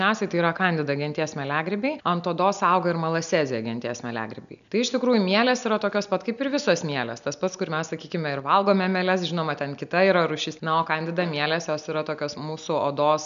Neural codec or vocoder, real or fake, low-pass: none; real; 7.2 kHz